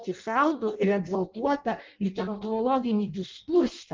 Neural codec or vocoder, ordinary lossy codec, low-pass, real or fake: codec, 16 kHz in and 24 kHz out, 0.6 kbps, FireRedTTS-2 codec; Opus, 32 kbps; 7.2 kHz; fake